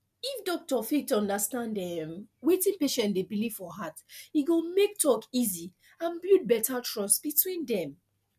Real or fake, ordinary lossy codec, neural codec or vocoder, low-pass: real; MP3, 96 kbps; none; 14.4 kHz